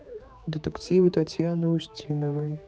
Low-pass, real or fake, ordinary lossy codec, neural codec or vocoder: none; fake; none; codec, 16 kHz, 4 kbps, X-Codec, HuBERT features, trained on general audio